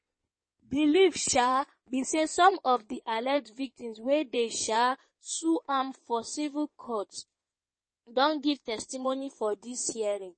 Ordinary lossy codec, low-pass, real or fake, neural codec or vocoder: MP3, 32 kbps; 9.9 kHz; fake; codec, 16 kHz in and 24 kHz out, 2.2 kbps, FireRedTTS-2 codec